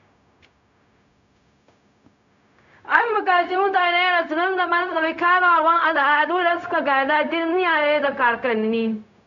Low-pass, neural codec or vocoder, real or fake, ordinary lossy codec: 7.2 kHz; codec, 16 kHz, 0.4 kbps, LongCat-Audio-Codec; fake; none